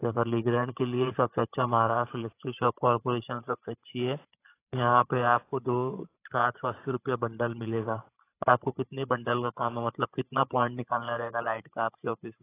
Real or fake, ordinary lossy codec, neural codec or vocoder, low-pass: fake; AAC, 24 kbps; codec, 16 kHz, 16 kbps, FreqCodec, larger model; 3.6 kHz